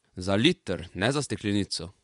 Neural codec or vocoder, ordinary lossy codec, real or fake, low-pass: vocoder, 24 kHz, 100 mel bands, Vocos; none; fake; 10.8 kHz